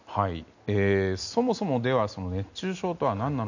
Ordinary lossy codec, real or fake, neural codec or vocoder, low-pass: none; real; none; 7.2 kHz